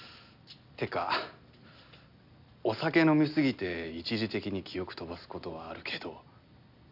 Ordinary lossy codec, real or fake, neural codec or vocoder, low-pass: none; real; none; 5.4 kHz